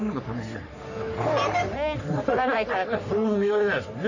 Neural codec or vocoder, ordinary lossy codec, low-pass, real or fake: codec, 44.1 kHz, 3.4 kbps, Pupu-Codec; none; 7.2 kHz; fake